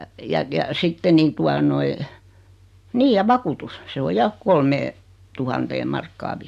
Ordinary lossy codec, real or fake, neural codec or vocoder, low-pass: AAC, 96 kbps; real; none; 14.4 kHz